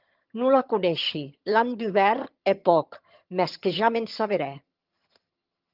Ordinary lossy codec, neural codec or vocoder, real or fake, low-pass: Opus, 24 kbps; vocoder, 22.05 kHz, 80 mel bands, HiFi-GAN; fake; 5.4 kHz